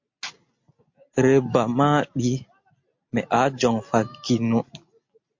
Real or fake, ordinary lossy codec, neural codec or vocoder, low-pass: real; MP3, 48 kbps; none; 7.2 kHz